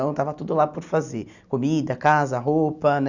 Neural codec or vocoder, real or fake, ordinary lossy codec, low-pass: none; real; none; 7.2 kHz